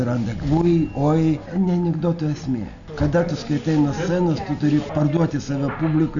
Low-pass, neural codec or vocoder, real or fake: 7.2 kHz; none; real